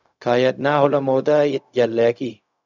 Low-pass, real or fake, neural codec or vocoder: 7.2 kHz; fake; codec, 16 kHz, 0.4 kbps, LongCat-Audio-Codec